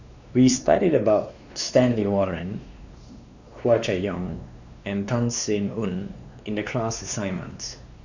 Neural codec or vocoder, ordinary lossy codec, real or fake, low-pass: codec, 16 kHz, 2 kbps, X-Codec, WavLM features, trained on Multilingual LibriSpeech; none; fake; 7.2 kHz